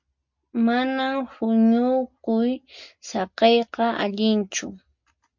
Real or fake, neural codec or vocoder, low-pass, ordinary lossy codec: real; none; 7.2 kHz; MP3, 48 kbps